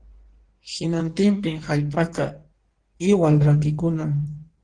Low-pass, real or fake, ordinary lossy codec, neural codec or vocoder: 9.9 kHz; fake; Opus, 16 kbps; codec, 16 kHz in and 24 kHz out, 1.1 kbps, FireRedTTS-2 codec